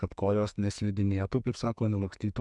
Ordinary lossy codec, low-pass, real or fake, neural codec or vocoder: MP3, 96 kbps; 10.8 kHz; fake; codec, 44.1 kHz, 2.6 kbps, SNAC